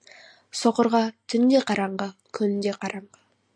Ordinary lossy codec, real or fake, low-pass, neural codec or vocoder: MP3, 64 kbps; real; 9.9 kHz; none